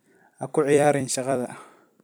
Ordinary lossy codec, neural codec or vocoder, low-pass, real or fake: none; vocoder, 44.1 kHz, 128 mel bands every 256 samples, BigVGAN v2; none; fake